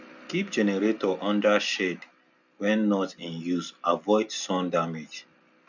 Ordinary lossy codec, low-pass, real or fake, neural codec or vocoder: none; 7.2 kHz; real; none